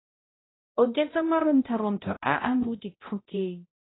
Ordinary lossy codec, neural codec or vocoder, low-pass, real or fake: AAC, 16 kbps; codec, 16 kHz, 0.5 kbps, X-Codec, HuBERT features, trained on balanced general audio; 7.2 kHz; fake